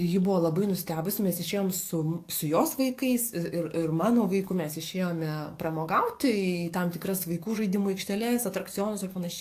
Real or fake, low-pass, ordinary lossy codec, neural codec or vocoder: fake; 14.4 kHz; AAC, 64 kbps; codec, 44.1 kHz, 7.8 kbps, DAC